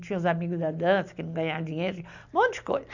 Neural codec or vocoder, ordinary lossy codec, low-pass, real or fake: none; none; 7.2 kHz; real